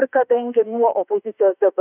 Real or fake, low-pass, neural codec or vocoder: fake; 3.6 kHz; autoencoder, 48 kHz, 32 numbers a frame, DAC-VAE, trained on Japanese speech